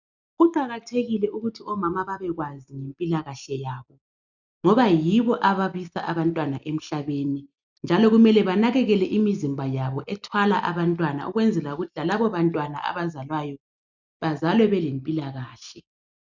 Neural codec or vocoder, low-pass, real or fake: none; 7.2 kHz; real